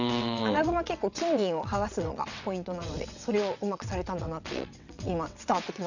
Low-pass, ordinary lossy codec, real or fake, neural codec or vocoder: 7.2 kHz; none; fake; vocoder, 22.05 kHz, 80 mel bands, WaveNeXt